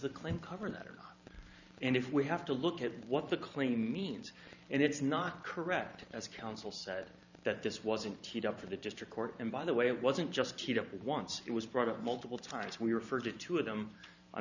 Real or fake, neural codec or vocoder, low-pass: fake; vocoder, 44.1 kHz, 128 mel bands every 512 samples, BigVGAN v2; 7.2 kHz